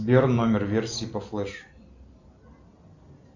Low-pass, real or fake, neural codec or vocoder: 7.2 kHz; real; none